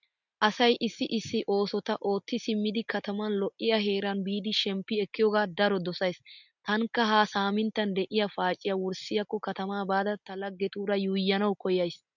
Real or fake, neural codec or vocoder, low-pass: real; none; 7.2 kHz